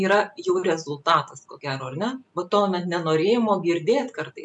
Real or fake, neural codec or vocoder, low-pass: real; none; 10.8 kHz